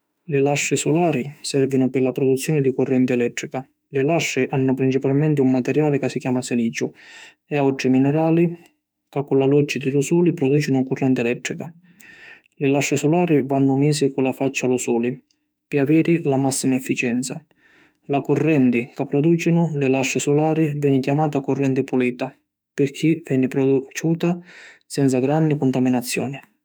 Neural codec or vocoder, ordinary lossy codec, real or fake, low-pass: autoencoder, 48 kHz, 32 numbers a frame, DAC-VAE, trained on Japanese speech; none; fake; none